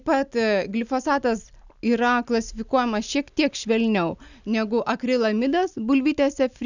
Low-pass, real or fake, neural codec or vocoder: 7.2 kHz; real; none